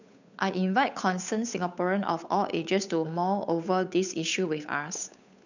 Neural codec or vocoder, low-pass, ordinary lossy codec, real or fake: codec, 16 kHz, 8 kbps, FunCodec, trained on Chinese and English, 25 frames a second; 7.2 kHz; none; fake